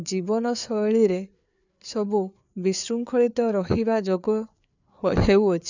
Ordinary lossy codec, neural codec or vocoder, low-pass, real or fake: none; codec, 16 kHz, 4 kbps, FreqCodec, larger model; 7.2 kHz; fake